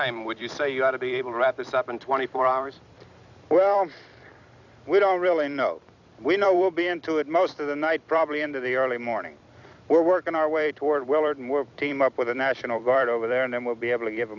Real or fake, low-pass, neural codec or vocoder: fake; 7.2 kHz; vocoder, 44.1 kHz, 128 mel bands every 256 samples, BigVGAN v2